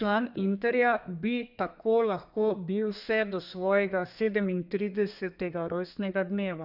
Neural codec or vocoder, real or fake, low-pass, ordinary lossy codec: codec, 32 kHz, 1.9 kbps, SNAC; fake; 5.4 kHz; none